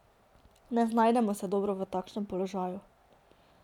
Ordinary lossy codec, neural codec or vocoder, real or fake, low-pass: none; none; real; 19.8 kHz